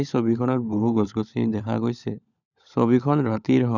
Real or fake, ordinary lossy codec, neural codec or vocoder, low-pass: fake; none; codec, 16 kHz, 16 kbps, FunCodec, trained on LibriTTS, 50 frames a second; 7.2 kHz